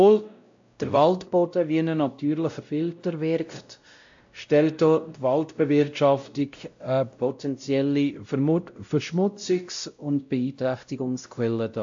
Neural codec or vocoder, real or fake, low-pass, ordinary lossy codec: codec, 16 kHz, 0.5 kbps, X-Codec, WavLM features, trained on Multilingual LibriSpeech; fake; 7.2 kHz; AAC, 64 kbps